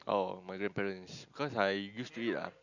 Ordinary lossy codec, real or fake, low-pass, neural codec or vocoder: none; real; 7.2 kHz; none